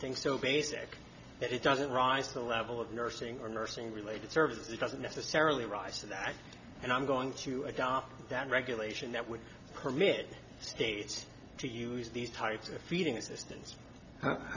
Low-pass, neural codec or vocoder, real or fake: 7.2 kHz; none; real